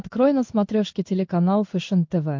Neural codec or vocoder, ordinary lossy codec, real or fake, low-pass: codec, 16 kHz in and 24 kHz out, 1 kbps, XY-Tokenizer; MP3, 48 kbps; fake; 7.2 kHz